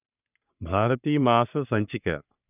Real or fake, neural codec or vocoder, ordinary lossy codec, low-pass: fake; codec, 44.1 kHz, 3.4 kbps, Pupu-Codec; none; 3.6 kHz